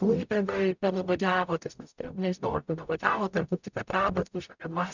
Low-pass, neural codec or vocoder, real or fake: 7.2 kHz; codec, 44.1 kHz, 0.9 kbps, DAC; fake